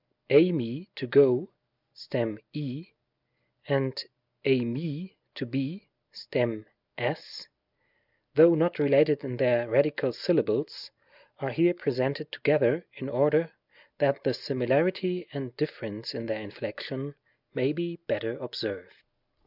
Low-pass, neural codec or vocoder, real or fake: 5.4 kHz; none; real